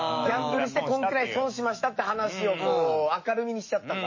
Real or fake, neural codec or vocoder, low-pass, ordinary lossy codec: fake; autoencoder, 48 kHz, 128 numbers a frame, DAC-VAE, trained on Japanese speech; 7.2 kHz; MP3, 32 kbps